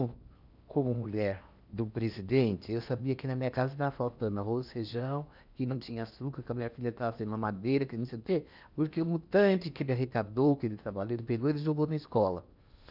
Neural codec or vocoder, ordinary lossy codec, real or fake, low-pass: codec, 16 kHz in and 24 kHz out, 0.8 kbps, FocalCodec, streaming, 65536 codes; none; fake; 5.4 kHz